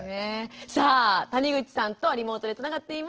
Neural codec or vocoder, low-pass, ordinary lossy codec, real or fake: none; 7.2 kHz; Opus, 16 kbps; real